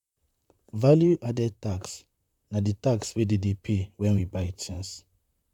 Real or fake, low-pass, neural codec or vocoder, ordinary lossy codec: fake; 19.8 kHz; vocoder, 44.1 kHz, 128 mel bands, Pupu-Vocoder; none